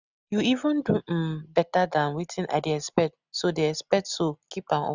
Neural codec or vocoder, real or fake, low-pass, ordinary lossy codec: none; real; 7.2 kHz; none